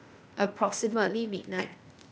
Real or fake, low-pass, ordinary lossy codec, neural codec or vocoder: fake; none; none; codec, 16 kHz, 0.8 kbps, ZipCodec